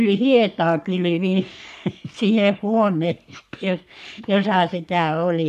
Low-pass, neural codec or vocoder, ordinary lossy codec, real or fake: 14.4 kHz; codec, 44.1 kHz, 3.4 kbps, Pupu-Codec; none; fake